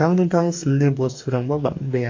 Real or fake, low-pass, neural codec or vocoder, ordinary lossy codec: fake; 7.2 kHz; codec, 44.1 kHz, 2.6 kbps, DAC; AAC, 48 kbps